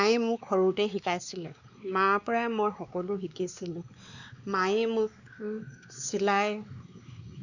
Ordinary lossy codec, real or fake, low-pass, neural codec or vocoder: none; fake; 7.2 kHz; codec, 16 kHz, 2 kbps, X-Codec, WavLM features, trained on Multilingual LibriSpeech